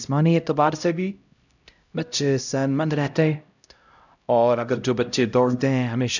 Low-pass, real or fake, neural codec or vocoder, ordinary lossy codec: 7.2 kHz; fake; codec, 16 kHz, 0.5 kbps, X-Codec, HuBERT features, trained on LibriSpeech; none